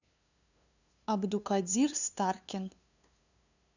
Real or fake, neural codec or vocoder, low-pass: fake; codec, 16 kHz, 2 kbps, FunCodec, trained on LibriTTS, 25 frames a second; 7.2 kHz